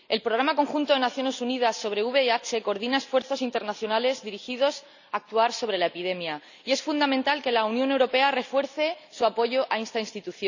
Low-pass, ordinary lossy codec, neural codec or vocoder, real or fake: 7.2 kHz; none; none; real